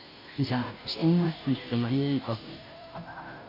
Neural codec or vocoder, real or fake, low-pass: codec, 16 kHz, 0.5 kbps, FunCodec, trained on Chinese and English, 25 frames a second; fake; 5.4 kHz